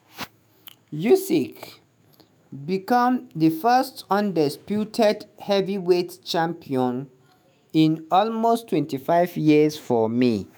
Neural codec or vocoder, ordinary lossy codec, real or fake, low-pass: autoencoder, 48 kHz, 128 numbers a frame, DAC-VAE, trained on Japanese speech; none; fake; none